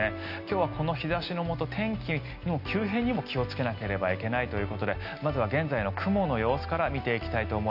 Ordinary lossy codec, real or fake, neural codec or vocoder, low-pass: none; real; none; 5.4 kHz